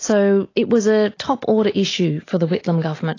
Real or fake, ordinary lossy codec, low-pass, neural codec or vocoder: real; AAC, 32 kbps; 7.2 kHz; none